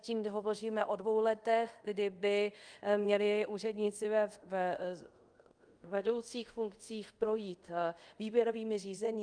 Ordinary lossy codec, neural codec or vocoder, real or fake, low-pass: Opus, 32 kbps; codec, 24 kHz, 0.5 kbps, DualCodec; fake; 9.9 kHz